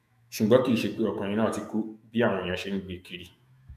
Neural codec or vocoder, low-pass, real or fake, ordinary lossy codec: autoencoder, 48 kHz, 128 numbers a frame, DAC-VAE, trained on Japanese speech; 14.4 kHz; fake; none